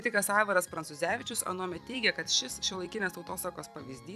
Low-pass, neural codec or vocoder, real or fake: 14.4 kHz; vocoder, 44.1 kHz, 128 mel bands every 256 samples, BigVGAN v2; fake